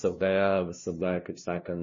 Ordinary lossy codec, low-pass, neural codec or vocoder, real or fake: MP3, 32 kbps; 7.2 kHz; codec, 16 kHz, 0.5 kbps, FunCodec, trained on LibriTTS, 25 frames a second; fake